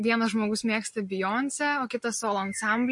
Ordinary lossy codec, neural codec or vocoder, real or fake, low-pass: MP3, 48 kbps; none; real; 10.8 kHz